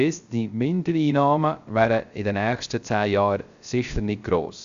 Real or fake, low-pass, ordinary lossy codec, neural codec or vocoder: fake; 7.2 kHz; Opus, 64 kbps; codec, 16 kHz, 0.3 kbps, FocalCodec